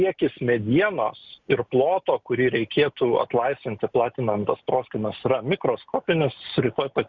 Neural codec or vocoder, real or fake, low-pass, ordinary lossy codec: none; real; 7.2 kHz; Opus, 64 kbps